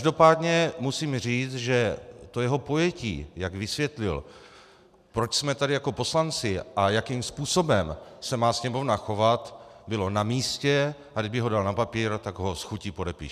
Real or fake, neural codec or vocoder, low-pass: real; none; 14.4 kHz